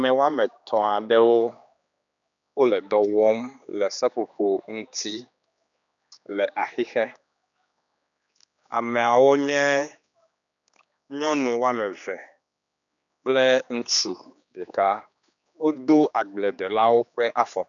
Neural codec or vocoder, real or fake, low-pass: codec, 16 kHz, 2 kbps, X-Codec, HuBERT features, trained on general audio; fake; 7.2 kHz